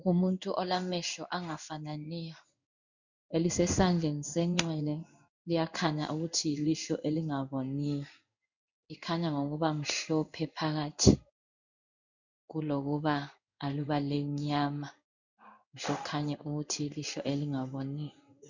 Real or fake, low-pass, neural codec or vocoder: fake; 7.2 kHz; codec, 16 kHz in and 24 kHz out, 1 kbps, XY-Tokenizer